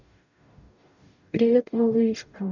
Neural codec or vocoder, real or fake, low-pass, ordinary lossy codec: codec, 44.1 kHz, 0.9 kbps, DAC; fake; 7.2 kHz; none